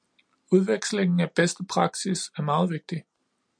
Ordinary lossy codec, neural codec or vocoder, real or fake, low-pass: MP3, 64 kbps; none; real; 9.9 kHz